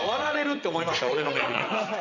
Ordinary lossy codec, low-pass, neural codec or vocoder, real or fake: none; 7.2 kHz; vocoder, 22.05 kHz, 80 mel bands, WaveNeXt; fake